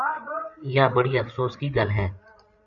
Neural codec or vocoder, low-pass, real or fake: codec, 16 kHz, 8 kbps, FreqCodec, larger model; 7.2 kHz; fake